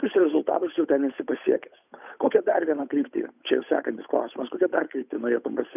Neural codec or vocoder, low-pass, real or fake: codec, 16 kHz, 8 kbps, FunCodec, trained on Chinese and English, 25 frames a second; 3.6 kHz; fake